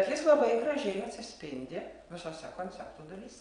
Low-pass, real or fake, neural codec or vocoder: 9.9 kHz; fake; vocoder, 22.05 kHz, 80 mel bands, WaveNeXt